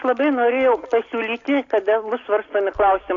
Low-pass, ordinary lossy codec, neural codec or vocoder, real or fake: 7.2 kHz; AAC, 48 kbps; none; real